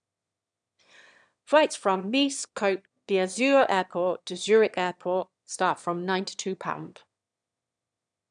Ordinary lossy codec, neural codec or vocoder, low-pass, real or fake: none; autoencoder, 22.05 kHz, a latent of 192 numbers a frame, VITS, trained on one speaker; 9.9 kHz; fake